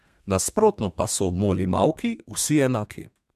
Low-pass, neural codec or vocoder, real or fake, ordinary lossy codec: 14.4 kHz; codec, 32 kHz, 1.9 kbps, SNAC; fake; MP3, 96 kbps